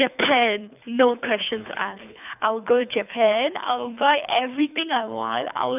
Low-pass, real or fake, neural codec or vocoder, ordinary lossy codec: 3.6 kHz; fake; codec, 24 kHz, 3 kbps, HILCodec; none